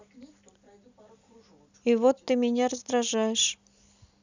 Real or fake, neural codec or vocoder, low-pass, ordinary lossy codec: real; none; 7.2 kHz; none